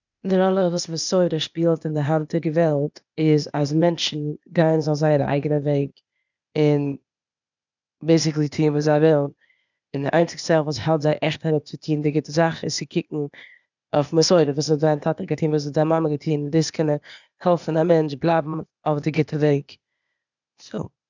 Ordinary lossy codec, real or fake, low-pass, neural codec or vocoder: none; fake; 7.2 kHz; codec, 16 kHz, 0.8 kbps, ZipCodec